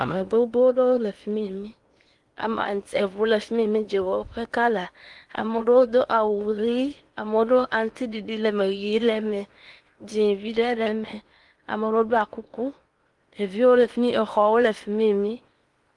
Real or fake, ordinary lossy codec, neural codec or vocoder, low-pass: fake; Opus, 32 kbps; codec, 16 kHz in and 24 kHz out, 0.8 kbps, FocalCodec, streaming, 65536 codes; 10.8 kHz